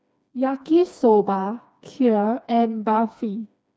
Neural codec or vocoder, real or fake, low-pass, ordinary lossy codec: codec, 16 kHz, 2 kbps, FreqCodec, smaller model; fake; none; none